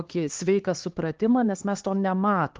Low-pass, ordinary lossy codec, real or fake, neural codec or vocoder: 7.2 kHz; Opus, 16 kbps; fake; codec, 16 kHz, 4 kbps, X-Codec, HuBERT features, trained on LibriSpeech